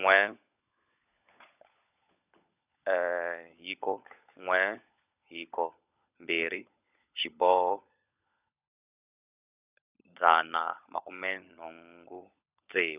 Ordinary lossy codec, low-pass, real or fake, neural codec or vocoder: none; 3.6 kHz; fake; codec, 16 kHz, 16 kbps, FunCodec, trained on LibriTTS, 50 frames a second